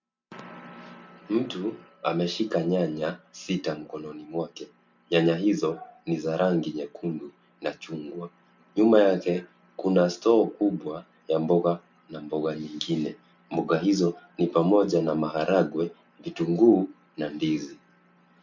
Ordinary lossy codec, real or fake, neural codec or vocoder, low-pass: MP3, 64 kbps; real; none; 7.2 kHz